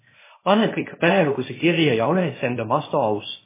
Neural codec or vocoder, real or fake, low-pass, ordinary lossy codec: codec, 16 kHz, 0.8 kbps, ZipCodec; fake; 3.6 kHz; MP3, 16 kbps